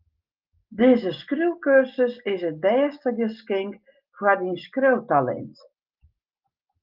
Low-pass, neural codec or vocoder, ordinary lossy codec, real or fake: 5.4 kHz; none; Opus, 32 kbps; real